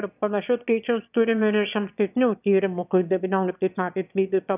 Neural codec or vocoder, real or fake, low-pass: autoencoder, 22.05 kHz, a latent of 192 numbers a frame, VITS, trained on one speaker; fake; 3.6 kHz